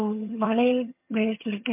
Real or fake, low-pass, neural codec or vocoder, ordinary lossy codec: fake; 3.6 kHz; vocoder, 22.05 kHz, 80 mel bands, HiFi-GAN; MP3, 32 kbps